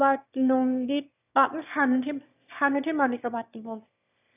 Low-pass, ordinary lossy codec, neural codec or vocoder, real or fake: 3.6 kHz; none; autoencoder, 22.05 kHz, a latent of 192 numbers a frame, VITS, trained on one speaker; fake